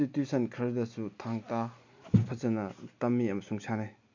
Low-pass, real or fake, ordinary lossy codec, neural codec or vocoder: 7.2 kHz; real; MP3, 48 kbps; none